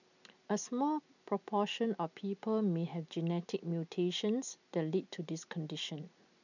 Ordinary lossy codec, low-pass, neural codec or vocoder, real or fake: none; 7.2 kHz; none; real